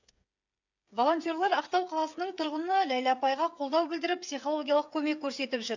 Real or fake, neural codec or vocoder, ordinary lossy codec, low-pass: fake; codec, 16 kHz, 8 kbps, FreqCodec, smaller model; none; 7.2 kHz